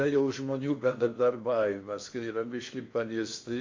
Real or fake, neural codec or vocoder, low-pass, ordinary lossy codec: fake; codec, 16 kHz in and 24 kHz out, 0.8 kbps, FocalCodec, streaming, 65536 codes; 7.2 kHz; MP3, 48 kbps